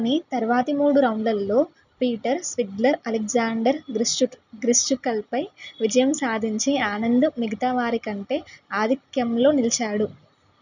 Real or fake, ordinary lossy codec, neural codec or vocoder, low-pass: real; none; none; 7.2 kHz